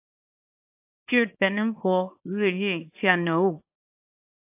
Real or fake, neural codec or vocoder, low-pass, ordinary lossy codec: fake; codec, 24 kHz, 0.9 kbps, WavTokenizer, small release; 3.6 kHz; AAC, 32 kbps